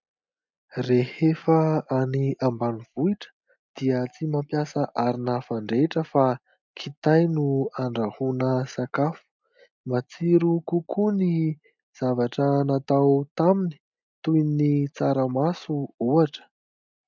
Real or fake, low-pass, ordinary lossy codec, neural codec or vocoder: real; 7.2 kHz; MP3, 64 kbps; none